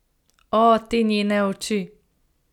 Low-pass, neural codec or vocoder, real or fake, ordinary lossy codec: 19.8 kHz; none; real; none